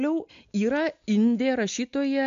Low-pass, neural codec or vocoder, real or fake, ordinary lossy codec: 7.2 kHz; none; real; AAC, 64 kbps